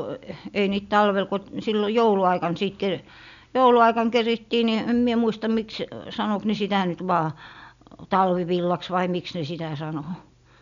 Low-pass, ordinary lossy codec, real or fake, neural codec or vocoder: 7.2 kHz; none; real; none